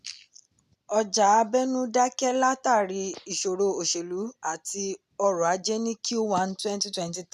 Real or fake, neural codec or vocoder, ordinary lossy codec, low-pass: real; none; none; 14.4 kHz